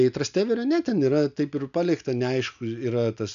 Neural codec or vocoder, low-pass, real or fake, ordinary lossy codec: none; 7.2 kHz; real; MP3, 96 kbps